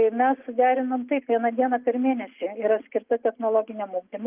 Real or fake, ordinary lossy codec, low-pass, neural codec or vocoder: real; Opus, 24 kbps; 3.6 kHz; none